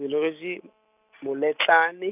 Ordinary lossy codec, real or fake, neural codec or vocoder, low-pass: none; real; none; 3.6 kHz